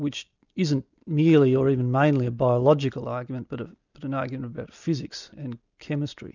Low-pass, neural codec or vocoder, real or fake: 7.2 kHz; none; real